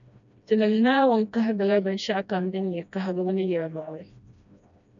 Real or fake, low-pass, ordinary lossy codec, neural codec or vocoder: fake; 7.2 kHz; MP3, 96 kbps; codec, 16 kHz, 1 kbps, FreqCodec, smaller model